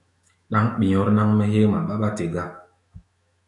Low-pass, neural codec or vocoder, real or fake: 10.8 kHz; autoencoder, 48 kHz, 128 numbers a frame, DAC-VAE, trained on Japanese speech; fake